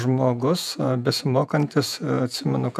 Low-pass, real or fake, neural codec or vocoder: 14.4 kHz; fake; vocoder, 48 kHz, 128 mel bands, Vocos